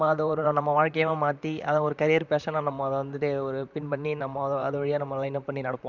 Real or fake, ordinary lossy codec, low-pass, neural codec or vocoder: fake; none; 7.2 kHz; vocoder, 44.1 kHz, 128 mel bands, Pupu-Vocoder